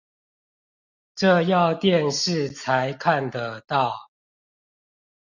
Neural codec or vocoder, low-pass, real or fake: none; 7.2 kHz; real